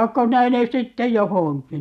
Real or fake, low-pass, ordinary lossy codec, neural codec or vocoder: real; 14.4 kHz; none; none